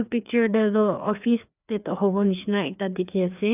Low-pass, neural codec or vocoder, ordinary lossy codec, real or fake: 3.6 kHz; codec, 16 kHz, 2 kbps, FreqCodec, larger model; none; fake